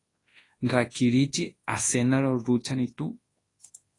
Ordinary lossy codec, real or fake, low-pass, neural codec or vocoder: AAC, 32 kbps; fake; 10.8 kHz; codec, 24 kHz, 0.9 kbps, WavTokenizer, large speech release